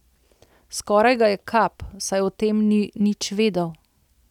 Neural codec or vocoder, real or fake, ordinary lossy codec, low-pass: none; real; none; 19.8 kHz